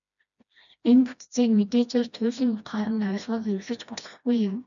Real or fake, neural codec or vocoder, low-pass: fake; codec, 16 kHz, 1 kbps, FreqCodec, smaller model; 7.2 kHz